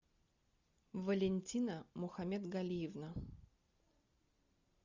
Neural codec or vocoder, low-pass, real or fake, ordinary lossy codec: none; 7.2 kHz; real; Opus, 64 kbps